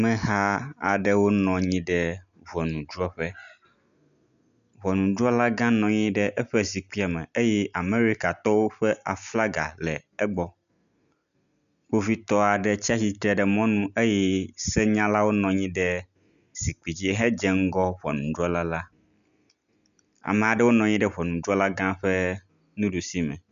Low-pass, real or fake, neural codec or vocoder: 7.2 kHz; real; none